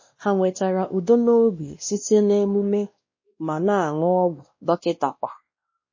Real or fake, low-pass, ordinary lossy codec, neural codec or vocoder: fake; 7.2 kHz; MP3, 32 kbps; codec, 16 kHz, 1 kbps, X-Codec, WavLM features, trained on Multilingual LibriSpeech